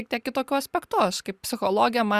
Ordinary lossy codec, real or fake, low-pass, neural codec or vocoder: Opus, 64 kbps; real; 14.4 kHz; none